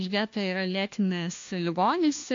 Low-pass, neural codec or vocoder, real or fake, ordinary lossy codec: 7.2 kHz; codec, 16 kHz, 1 kbps, FunCodec, trained on LibriTTS, 50 frames a second; fake; AAC, 48 kbps